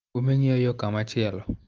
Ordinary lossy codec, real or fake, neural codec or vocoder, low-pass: Opus, 24 kbps; real; none; 7.2 kHz